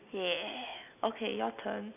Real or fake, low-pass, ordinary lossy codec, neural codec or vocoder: real; 3.6 kHz; none; none